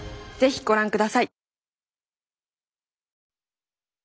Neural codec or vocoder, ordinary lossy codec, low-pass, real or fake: none; none; none; real